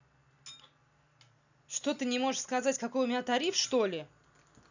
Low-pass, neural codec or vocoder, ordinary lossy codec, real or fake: 7.2 kHz; none; none; real